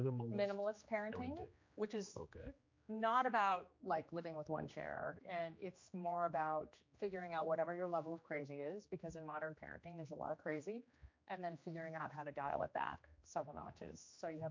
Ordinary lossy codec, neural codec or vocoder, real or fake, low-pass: MP3, 48 kbps; codec, 16 kHz, 2 kbps, X-Codec, HuBERT features, trained on general audio; fake; 7.2 kHz